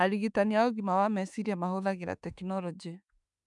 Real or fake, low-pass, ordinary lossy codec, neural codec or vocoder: fake; 10.8 kHz; none; autoencoder, 48 kHz, 32 numbers a frame, DAC-VAE, trained on Japanese speech